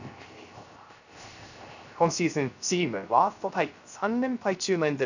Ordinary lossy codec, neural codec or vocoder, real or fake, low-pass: none; codec, 16 kHz, 0.3 kbps, FocalCodec; fake; 7.2 kHz